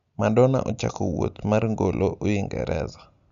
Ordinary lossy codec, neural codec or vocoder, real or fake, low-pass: none; none; real; 7.2 kHz